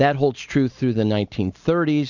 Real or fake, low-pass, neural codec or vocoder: real; 7.2 kHz; none